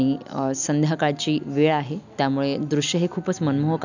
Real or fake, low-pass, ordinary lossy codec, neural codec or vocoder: real; 7.2 kHz; none; none